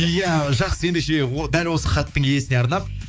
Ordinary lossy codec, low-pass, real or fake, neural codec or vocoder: none; none; fake; codec, 16 kHz, 4 kbps, X-Codec, HuBERT features, trained on balanced general audio